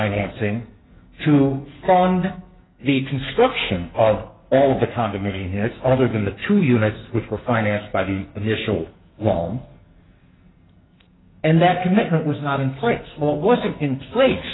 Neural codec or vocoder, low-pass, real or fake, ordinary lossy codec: codec, 44.1 kHz, 2.6 kbps, SNAC; 7.2 kHz; fake; AAC, 16 kbps